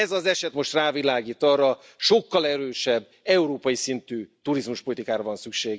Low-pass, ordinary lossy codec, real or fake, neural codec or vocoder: none; none; real; none